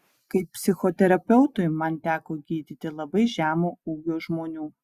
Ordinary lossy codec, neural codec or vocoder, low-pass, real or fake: Opus, 64 kbps; none; 14.4 kHz; real